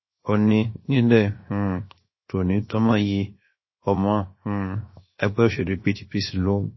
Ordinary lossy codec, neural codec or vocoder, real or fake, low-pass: MP3, 24 kbps; codec, 16 kHz, 0.7 kbps, FocalCodec; fake; 7.2 kHz